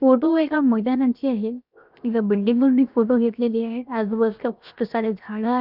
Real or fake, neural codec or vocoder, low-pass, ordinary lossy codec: fake; codec, 16 kHz, 0.7 kbps, FocalCodec; 5.4 kHz; none